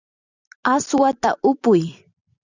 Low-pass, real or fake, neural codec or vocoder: 7.2 kHz; fake; vocoder, 44.1 kHz, 128 mel bands every 256 samples, BigVGAN v2